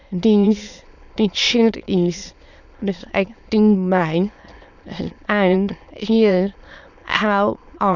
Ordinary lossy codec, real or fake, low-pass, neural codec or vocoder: none; fake; 7.2 kHz; autoencoder, 22.05 kHz, a latent of 192 numbers a frame, VITS, trained on many speakers